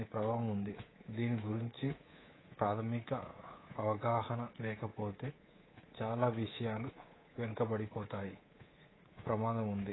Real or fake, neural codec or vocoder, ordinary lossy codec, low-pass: fake; codec, 24 kHz, 3.1 kbps, DualCodec; AAC, 16 kbps; 7.2 kHz